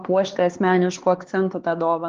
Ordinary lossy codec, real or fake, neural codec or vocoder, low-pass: Opus, 16 kbps; fake; codec, 16 kHz, 4 kbps, X-Codec, HuBERT features, trained on LibriSpeech; 7.2 kHz